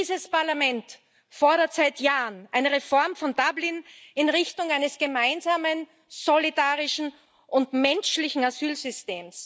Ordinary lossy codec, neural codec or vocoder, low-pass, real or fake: none; none; none; real